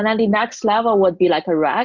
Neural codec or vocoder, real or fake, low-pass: none; real; 7.2 kHz